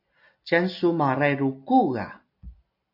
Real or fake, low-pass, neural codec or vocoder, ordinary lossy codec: real; 5.4 kHz; none; AAC, 48 kbps